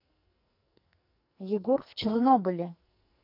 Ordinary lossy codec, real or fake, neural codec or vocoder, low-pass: none; fake; codec, 44.1 kHz, 2.6 kbps, SNAC; 5.4 kHz